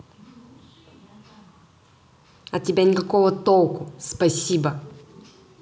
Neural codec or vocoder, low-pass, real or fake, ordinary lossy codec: none; none; real; none